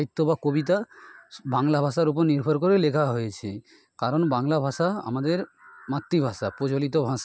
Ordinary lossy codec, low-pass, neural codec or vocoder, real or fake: none; none; none; real